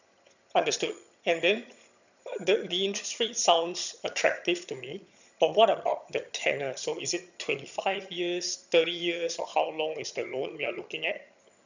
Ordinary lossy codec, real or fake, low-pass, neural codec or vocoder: none; fake; 7.2 kHz; vocoder, 22.05 kHz, 80 mel bands, HiFi-GAN